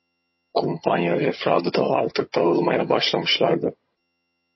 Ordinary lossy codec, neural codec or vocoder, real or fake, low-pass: MP3, 24 kbps; vocoder, 22.05 kHz, 80 mel bands, HiFi-GAN; fake; 7.2 kHz